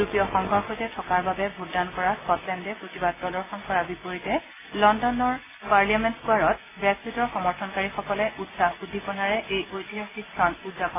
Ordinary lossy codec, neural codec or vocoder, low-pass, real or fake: AAC, 16 kbps; none; 3.6 kHz; real